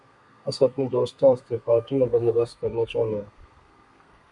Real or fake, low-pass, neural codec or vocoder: fake; 10.8 kHz; codec, 32 kHz, 1.9 kbps, SNAC